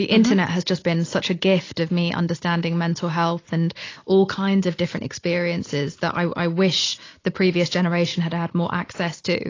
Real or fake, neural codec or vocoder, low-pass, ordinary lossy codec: real; none; 7.2 kHz; AAC, 32 kbps